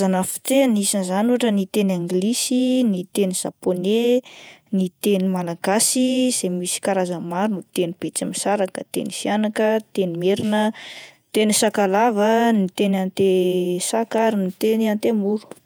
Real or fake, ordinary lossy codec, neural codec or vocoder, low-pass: fake; none; vocoder, 48 kHz, 128 mel bands, Vocos; none